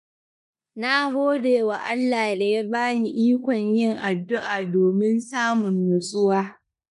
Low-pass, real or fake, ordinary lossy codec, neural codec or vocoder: 10.8 kHz; fake; none; codec, 16 kHz in and 24 kHz out, 0.9 kbps, LongCat-Audio-Codec, four codebook decoder